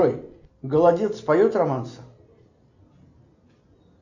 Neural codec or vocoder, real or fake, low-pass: none; real; 7.2 kHz